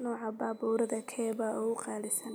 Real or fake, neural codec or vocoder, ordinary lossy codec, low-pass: real; none; none; none